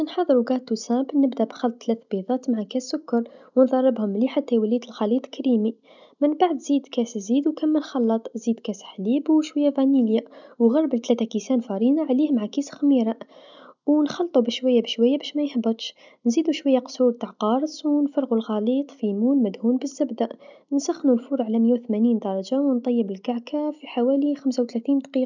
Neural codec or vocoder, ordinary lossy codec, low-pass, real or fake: none; none; 7.2 kHz; real